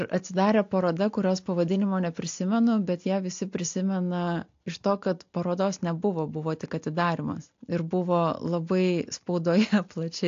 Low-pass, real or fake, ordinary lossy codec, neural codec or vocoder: 7.2 kHz; real; AAC, 48 kbps; none